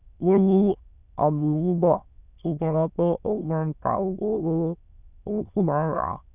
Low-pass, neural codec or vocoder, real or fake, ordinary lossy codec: 3.6 kHz; autoencoder, 22.05 kHz, a latent of 192 numbers a frame, VITS, trained on many speakers; fake; none